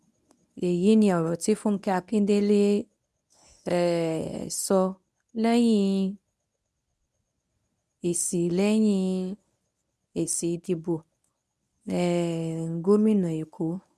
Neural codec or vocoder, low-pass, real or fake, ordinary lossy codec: codec, 24 kHz, 0.9 kbps, WavTokenizer, medium speech release version 1; none; fake; none